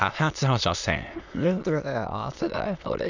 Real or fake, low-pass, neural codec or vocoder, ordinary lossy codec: fake; 7.2 kHz; autoencoder, 22.05 kHz, a latent of 192 numbers a frame, VITS, trained on many speakers; none